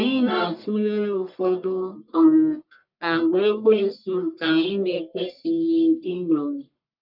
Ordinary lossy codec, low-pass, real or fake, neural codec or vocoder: none; 5.4 kHz; fake; codec, 44.1 kHz, 1.7 kbps, Pupu-Codec